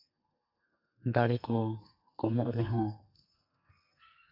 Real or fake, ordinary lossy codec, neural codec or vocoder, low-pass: fake; none; codec, 44.1 kHz, 2.6 kbps, SNAC; 5.4 kHz